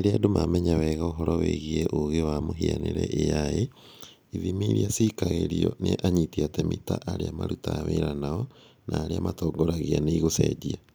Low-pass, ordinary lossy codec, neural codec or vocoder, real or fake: none; none; none; real